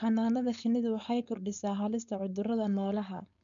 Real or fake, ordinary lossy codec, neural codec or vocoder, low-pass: fake; none; codec, 16 kHz, 4.8 kbps, FACodec; 7.2 kHz